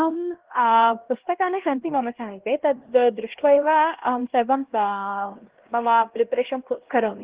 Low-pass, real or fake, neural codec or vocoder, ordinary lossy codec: 3.6 kHz; fake; codec, 16 kHz, 1 kbps, X-Codec, HuBERT features, trained on LibriSpeech; Opus, 16 kbps